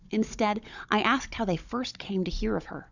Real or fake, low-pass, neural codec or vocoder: fake; 7.2 kHz; codec, 16 kHz, 16 kbps, FunCodec, trained on Chinese and English, 50 frames a second